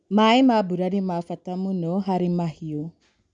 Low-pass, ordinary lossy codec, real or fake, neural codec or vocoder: 9.9 kHz; none; real; none